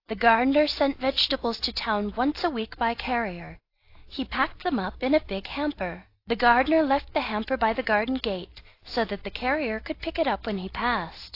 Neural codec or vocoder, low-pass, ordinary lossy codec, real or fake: none; 5.4 kHz; AAC, 32 kbps; real